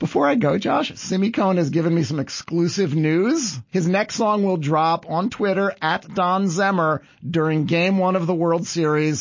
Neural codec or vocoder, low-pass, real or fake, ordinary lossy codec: none; 7.2 kHz; real; MP3, 32 kbps